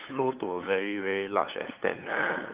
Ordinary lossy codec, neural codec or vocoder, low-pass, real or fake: Opus, 32 kbps; codec, 16 kHz, 8 kbps, FunCodec, trained on LibriTTS, 25 frames a second; 3.6 kHz; fake